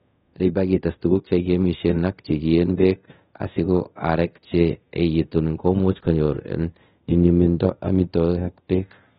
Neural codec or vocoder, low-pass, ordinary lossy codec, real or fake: codec, 24 kHz, 0.5 kbps, DualCodec; 10.8 kHz; AAC, 16 kbps; fake